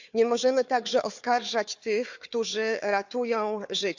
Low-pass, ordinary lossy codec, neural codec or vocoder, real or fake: 7.2 kHz; Opus, 64 kbps; codec, 16 kHz, 4 kbps, FunCodec, trained on Chinese and English, 50 frames a second; fake